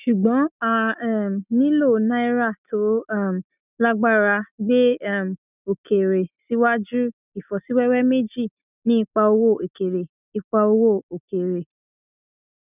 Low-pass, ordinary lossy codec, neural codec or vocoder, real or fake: 3.6 kHz; none; none; real